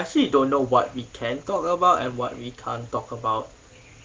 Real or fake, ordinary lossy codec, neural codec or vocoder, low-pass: real; Opus, 16 kbps; none; 7.2 kHz